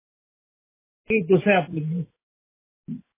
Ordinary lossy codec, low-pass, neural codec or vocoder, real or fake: MP3, 16 kbps; 3.6 kHz; none; real